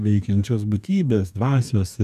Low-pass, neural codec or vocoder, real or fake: 14.4 kHz; codec, 44.1 kHz, 2.6 kbps, DAC; fake